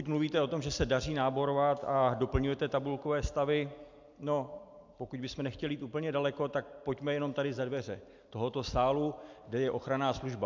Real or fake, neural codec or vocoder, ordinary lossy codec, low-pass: real; none; MP3, 64 kbps; 7.2 kHz